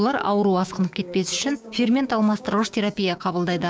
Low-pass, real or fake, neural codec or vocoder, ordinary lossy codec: none; fake; codec, 16 kHz, 6 kbps, DAC; none